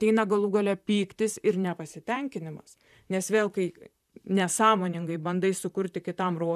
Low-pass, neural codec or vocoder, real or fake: 14.4 kHz; vocoder, 44.1 kHz, 128 mel bands, Pupu-Vocoder; fake